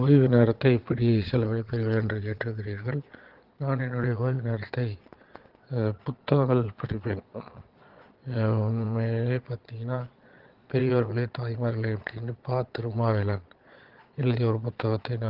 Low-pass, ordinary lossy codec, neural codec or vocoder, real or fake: 5.4 kHz; Opus, 16 kbps; vocoder, 22.05 kHz, 80 mel bands, Vocos; fake